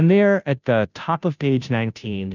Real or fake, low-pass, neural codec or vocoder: fake; 7.2 kHz; codec, 16 kHz, 0.5 kbps, FunCodec, trained on Chinese and English, 25 frames a second